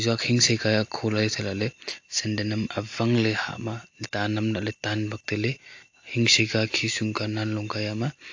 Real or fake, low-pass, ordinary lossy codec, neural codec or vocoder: real; 7.2 kHz; AAC, 48 kbps; none